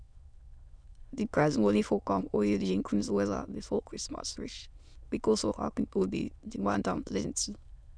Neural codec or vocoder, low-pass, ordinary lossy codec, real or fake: autoencoder, 22.05 kHz, a latent of 192 numbers a frame, VITS, trained on many speakers; 9.9 kHz; none; fake